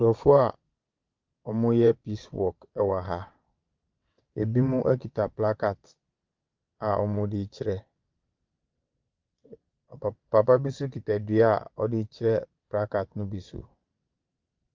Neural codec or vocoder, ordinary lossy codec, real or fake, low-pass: vocoder, 22.05 kHz, 80 mel bands, WaveNeXt; Opus, 24 kbps; fake; 7.2 kHz